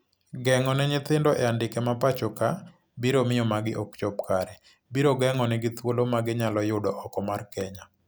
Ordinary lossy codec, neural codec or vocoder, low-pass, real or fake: none; none; none; real